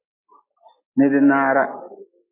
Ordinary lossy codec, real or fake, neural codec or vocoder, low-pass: MP3, 24 kbps; real; none; 3.6 kHz